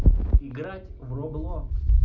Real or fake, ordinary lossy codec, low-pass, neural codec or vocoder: real; none; 7.2 kHz; none